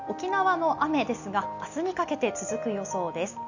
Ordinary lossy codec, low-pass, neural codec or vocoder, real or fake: none; 7.2 kHz; none; real